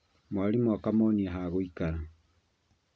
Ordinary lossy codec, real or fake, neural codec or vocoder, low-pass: none; real; none; none